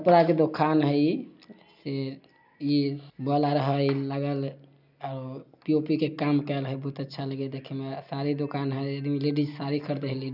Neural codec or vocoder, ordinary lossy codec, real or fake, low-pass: none; none; real; 5.4 kHz